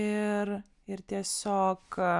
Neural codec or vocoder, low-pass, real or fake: none; 10.8 kHz; real